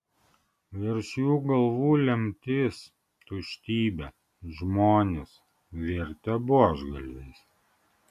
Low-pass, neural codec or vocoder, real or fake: 14.4 kHz; none; real